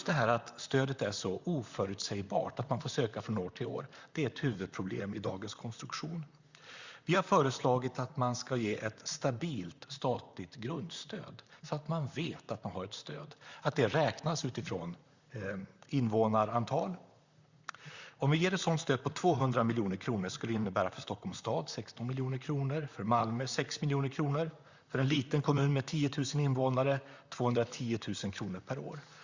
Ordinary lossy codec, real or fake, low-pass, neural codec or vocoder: Opus, 64 kbps; fake; 7.2 kHz; vocoder, 44.1 kHz, 128 mel bands, Pupu-Vocoder